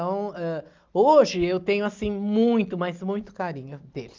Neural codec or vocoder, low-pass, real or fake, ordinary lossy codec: none; 7.2 kHz; real; Opus, 24 kbps